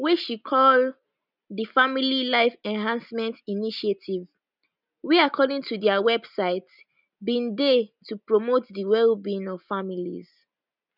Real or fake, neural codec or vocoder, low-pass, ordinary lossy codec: real; none; 5.4 kHz; none